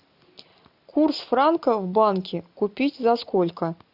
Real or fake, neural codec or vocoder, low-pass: real; none; 5.4 kHz